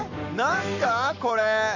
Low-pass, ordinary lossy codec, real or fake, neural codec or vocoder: 7.2 kHz; none; fake; codec, 44.1 kHz, 7.8 kbps, Pupu-Codec